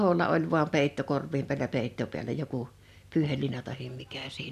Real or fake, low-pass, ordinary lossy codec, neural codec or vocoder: real; 14.4 kHz; none; none